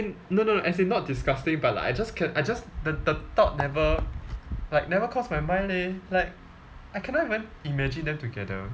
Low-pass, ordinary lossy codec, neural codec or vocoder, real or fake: none; none; none; real